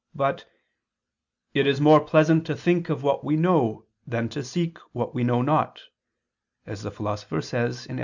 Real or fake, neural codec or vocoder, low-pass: real; none; 7.2 kHz